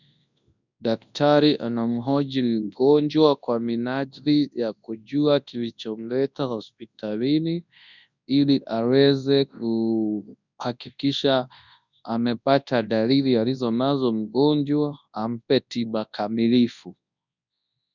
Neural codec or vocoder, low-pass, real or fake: codec, 24 kHz, 0.9 kbps, WavTokenizer, large speech release; 7.2 kHz; fake